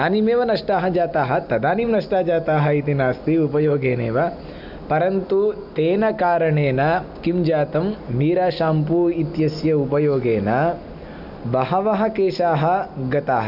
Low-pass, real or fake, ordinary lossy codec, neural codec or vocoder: 5.4 kHz; real; none; none